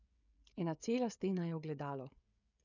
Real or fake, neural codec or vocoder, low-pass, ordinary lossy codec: fake; codec, 16 kHz, 16 kbps, FreqCodec, smaller model; 7.2 kHz; none